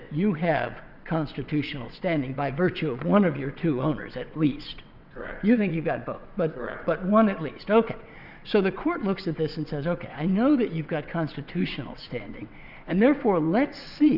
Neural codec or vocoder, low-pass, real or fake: vocoder, 22.05 kHz, 80 mel bands, WaveNeXt; 5.4 kHz; fake